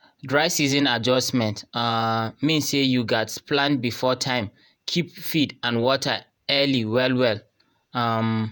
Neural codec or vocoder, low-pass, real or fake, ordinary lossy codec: vocoder, 48 kHz, 128 mel bands, Vocos; none; fake; none